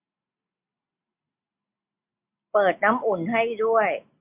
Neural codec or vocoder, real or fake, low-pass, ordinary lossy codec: none; real; 3.6 kHz; MP3, 32 kbps